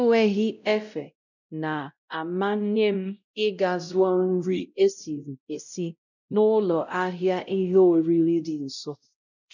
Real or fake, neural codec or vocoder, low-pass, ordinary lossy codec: fake; codec, 16 kHz, 0.5 kbps, X-Codec, WavLM features, trained on Multilingual LibriSpeech; 7.2 kHz; none